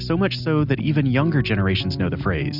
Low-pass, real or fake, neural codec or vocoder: 5.4 kHz; real; none